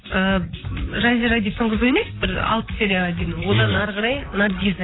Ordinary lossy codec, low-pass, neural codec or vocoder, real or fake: AAC, 16 kbps; 7.2 kHz; vocoder, 44.1 kHz, 128 mel bands, Pupu-Vocoder; fake